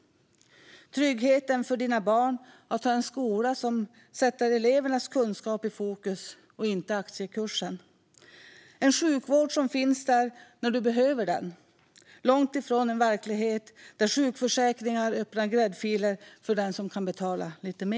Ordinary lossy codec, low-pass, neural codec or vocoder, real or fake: none; none; none; real